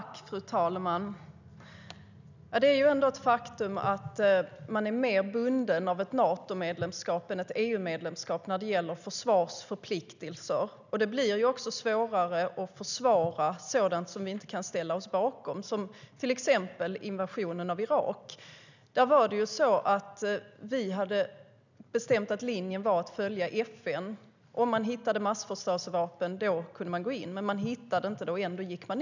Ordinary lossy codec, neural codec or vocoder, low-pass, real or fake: none; none; 7.2 kHz; real